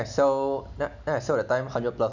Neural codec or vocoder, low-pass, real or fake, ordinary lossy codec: none; 7.2 kHz; real; none